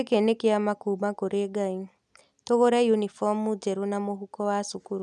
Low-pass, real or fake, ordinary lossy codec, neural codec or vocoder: none; real; none; none